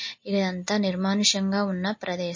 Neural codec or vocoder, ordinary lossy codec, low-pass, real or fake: none; MP3, 32 kbps; 7.2 kHz; real